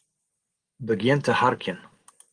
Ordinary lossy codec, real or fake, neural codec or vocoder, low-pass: Opus, 24 kbps; real; none; 9.9 kHz